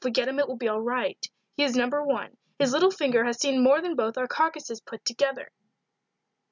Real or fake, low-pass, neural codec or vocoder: real; 7.2 kHz; none